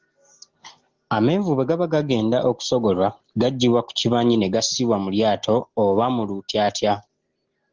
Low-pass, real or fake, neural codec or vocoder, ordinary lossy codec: 7.2 kHz; real; none; Opus, 16 kbps